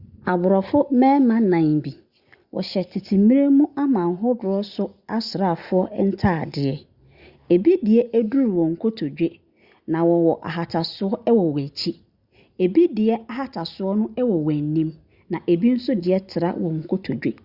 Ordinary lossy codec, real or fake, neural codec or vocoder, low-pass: Opus, 64 kbps; real; none; 5.4 kHz